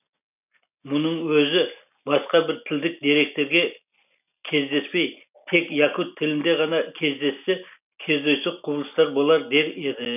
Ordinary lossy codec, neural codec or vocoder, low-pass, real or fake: none; none; 3.6 kHz; real